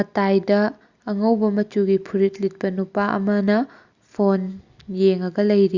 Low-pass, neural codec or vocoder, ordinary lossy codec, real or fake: 7.2 kHz; none; Opus, 64 kbps; real